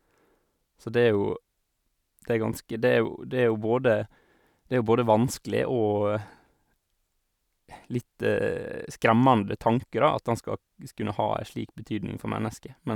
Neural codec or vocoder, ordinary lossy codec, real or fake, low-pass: none; none; real; 19.8 kHz